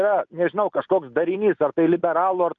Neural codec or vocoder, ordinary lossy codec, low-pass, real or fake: none; Opus, 24 kbps; 7.2 kHz; real